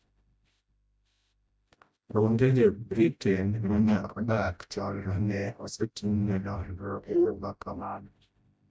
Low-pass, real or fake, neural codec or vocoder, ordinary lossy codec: none; fake; codec, 16 kHz, 0.5 kbps, FreqCodec, smaller model; none